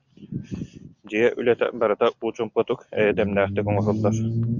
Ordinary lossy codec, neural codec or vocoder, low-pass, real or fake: Opus, 64 kbps; none; 7.2 kHz; real